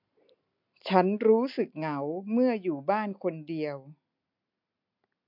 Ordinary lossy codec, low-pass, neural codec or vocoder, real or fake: MP3, 48 kbps; 5.4 kHz; none; real